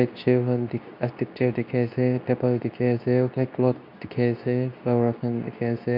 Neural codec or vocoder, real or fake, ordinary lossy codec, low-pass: codec, 24 kHz, 0.9 kbps, WavTokenizer, medium speech release version 2; fake; none; 5.4 kHz